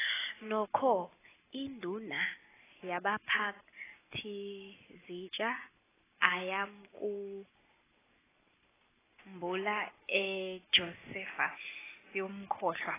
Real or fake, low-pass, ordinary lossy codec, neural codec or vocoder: real; 3.6 kHz; AAC, 16 kbps; none